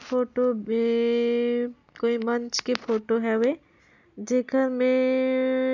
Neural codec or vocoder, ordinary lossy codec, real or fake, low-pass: none; none; real; 7.2 kHz